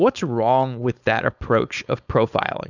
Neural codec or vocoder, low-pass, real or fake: codec, 16 kHz, 4.8 kbps, FACodec; 7.2 kHz; fake